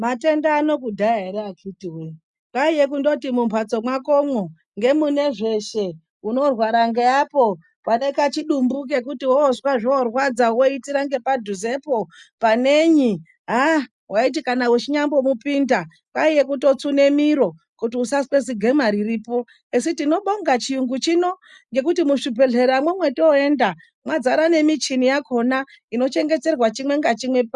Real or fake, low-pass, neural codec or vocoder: real; 10.8 kHz; none